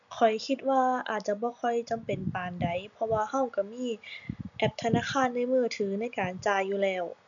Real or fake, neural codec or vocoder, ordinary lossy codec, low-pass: real; none; none; 7.2 kHz